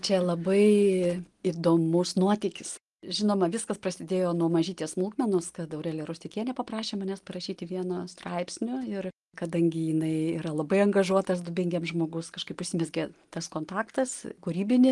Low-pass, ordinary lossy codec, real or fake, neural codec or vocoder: 10.8 kHz; Opus, 24 kbps; real; none